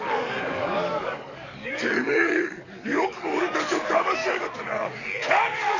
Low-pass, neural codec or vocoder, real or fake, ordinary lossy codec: 7.2 kHz; codec, 44.1 kHz, 7.8 kbps, DAC; fake; none